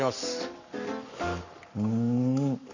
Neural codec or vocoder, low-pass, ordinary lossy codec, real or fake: codec, 44.1 kHz, 7.8 kbps, Pupu-Codec; 7.2 kHz; none; fake